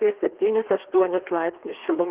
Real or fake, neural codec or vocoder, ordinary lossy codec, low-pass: fake; codec, 16 kHz, 2 kbps, FreqCodec, larger model; Opus, 16 kbps; 3.6 kHz